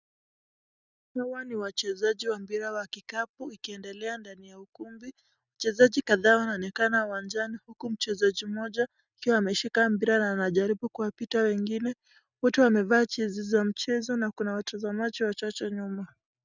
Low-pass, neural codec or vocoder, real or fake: 7.2 kHz; none; real